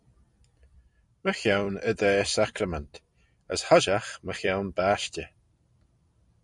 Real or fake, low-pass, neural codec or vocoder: fake; 10.8 kHz; vocoder, 44.1 kHz, 128 mel bands every 256 samples, BigVGAN v2